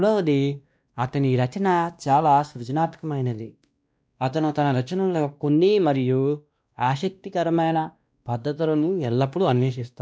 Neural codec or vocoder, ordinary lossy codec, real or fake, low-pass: codec, 16 kHz, 1 kbps, X-Codec, WavLM features, trained on Multilingual LibriSpeech; none; fake; none